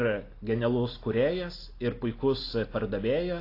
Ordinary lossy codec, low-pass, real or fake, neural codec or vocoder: AAC, 32 kbps; 5.4 kHz; real; none